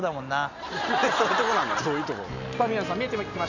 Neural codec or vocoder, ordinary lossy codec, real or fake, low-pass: none; none; real; 7.2 kHz